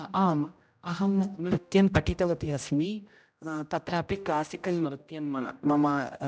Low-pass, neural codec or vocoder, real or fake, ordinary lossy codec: none; codec, 16 kHz, 0.5 kbps, X-Codec, HuBERT features, trained on general audio; fake; none